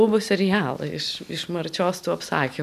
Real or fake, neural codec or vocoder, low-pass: real; none; 14.4 kHz